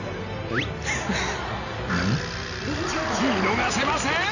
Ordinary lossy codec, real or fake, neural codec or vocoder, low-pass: none; real; none; 7.2 kHz